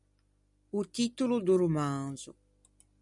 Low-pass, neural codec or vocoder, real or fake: 10.8 kHz; none; real